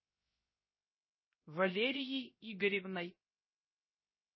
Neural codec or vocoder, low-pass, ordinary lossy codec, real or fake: codec, 16 kHz, 0.3 kbps, FocalCodec; 7.2 kHz; MP3, 24 kbps; fake